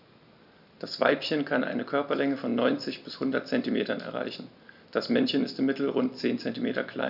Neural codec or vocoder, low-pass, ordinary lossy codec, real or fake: none; 5.4 kHz; none; real